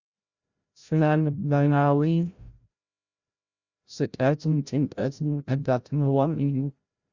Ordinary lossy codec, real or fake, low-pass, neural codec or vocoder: Opus, 64 kbps; fake; 7.2 kHz; codec, 16 kHz, 0.5 kbps, FreqCodec, larger model